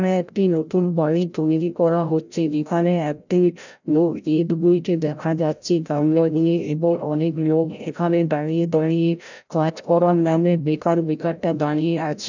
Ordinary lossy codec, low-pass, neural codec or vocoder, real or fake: none; 7.2 kHz; codec, 16 kHz, 0.5 kbps, FreqCodec, larger model; fake